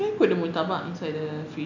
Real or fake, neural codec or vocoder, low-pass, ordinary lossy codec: real; none; 7.2 kHz; none